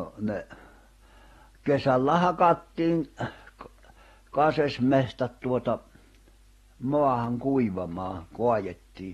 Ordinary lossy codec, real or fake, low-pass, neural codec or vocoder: AAC, 32 kbps; real; 19.8 kHz; none